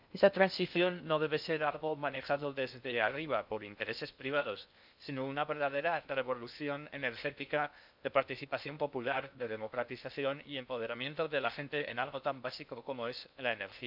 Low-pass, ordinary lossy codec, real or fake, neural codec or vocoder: 5.4 kHz; none; fake; codec, 16 kHz in and 24 kHz out, 0.6 kbps, FocalCodec, streaming, 2048 codes